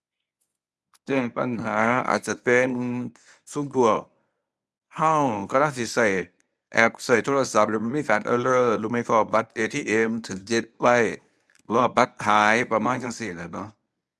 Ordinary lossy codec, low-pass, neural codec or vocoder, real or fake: none; none; codec, 24 kHz, 0.9 kbps, WavTokenizer, medium speech release version 1; fake